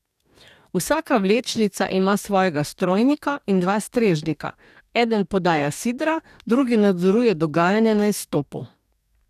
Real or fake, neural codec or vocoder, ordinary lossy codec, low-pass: fake; codec, 44.1 kHz, 2.6 kbps, DAC; none; 14.4 kHz